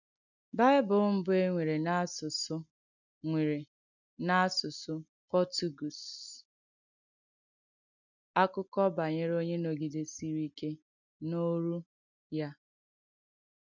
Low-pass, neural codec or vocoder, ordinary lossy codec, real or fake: 7.2 kHz; none; none; real